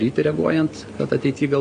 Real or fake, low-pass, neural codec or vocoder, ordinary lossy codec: real; 9.9 kHz; none; Opus, 64 kbps